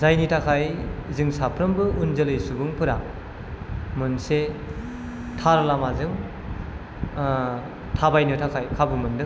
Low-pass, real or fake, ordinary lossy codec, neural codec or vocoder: none; real; none; none